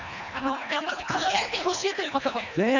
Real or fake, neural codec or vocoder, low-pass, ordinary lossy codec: fake; codec, 24 kHz, 1.5 kbps, HILCodec; 7.2 kHz; none